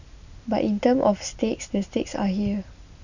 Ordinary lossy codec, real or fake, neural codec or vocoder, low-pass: none; real; none; 7.2 kHz